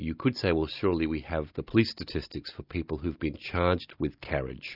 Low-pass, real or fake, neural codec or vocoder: 5.4 kHz; real; none